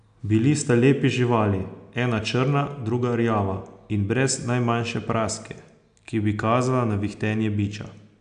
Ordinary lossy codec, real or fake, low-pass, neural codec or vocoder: none; real; 9.9 kHz; none